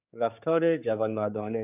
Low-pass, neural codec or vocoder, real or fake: 3.6 kHz; codec, 16 kHz, 4 kbps, X-Codec, HuBERT features, trained on general audio; fake